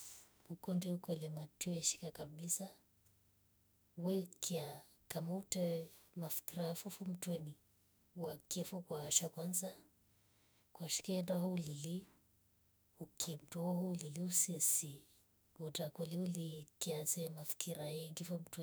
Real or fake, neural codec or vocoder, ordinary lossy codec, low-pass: fake; autoencoder, 48 kHz, 32 numbers a frame, DAC-VAE, trained on Japanese speech; none; none